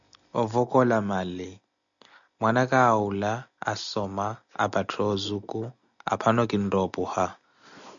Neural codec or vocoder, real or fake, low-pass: none; real; 7.2 kHz